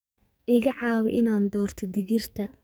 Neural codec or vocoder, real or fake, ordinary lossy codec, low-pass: codec, 44.1 kHz, 2.6 kbps, SNAC; fake; none; none